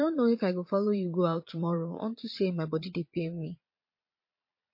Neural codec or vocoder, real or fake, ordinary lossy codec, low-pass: vocoder, 22.05 kHz, 80 mel bands, Vocos; fake; MP3, 32 kbps; 5.4 kHz